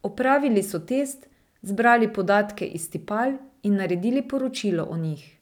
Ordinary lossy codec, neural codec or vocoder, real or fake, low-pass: none; none; real; 19.8 kHz